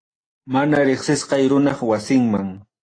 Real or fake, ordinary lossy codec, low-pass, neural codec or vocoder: real; AAC, 32 kbps; 9.9 kHz; none